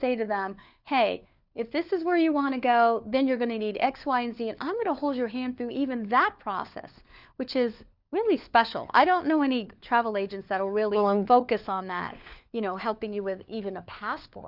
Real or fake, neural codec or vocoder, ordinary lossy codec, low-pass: fake; codec, 16 kHz, 4 kbps, FunCodec, trained on LibriTTS, 50 frames a second; Opus, 64 kbps; 5.4 kHz